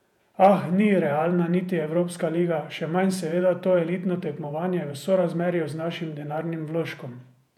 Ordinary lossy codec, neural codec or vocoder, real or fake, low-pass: none; vocoder, 48 kHz, 128 mel bands, Vocos; fake; 19.8 kHz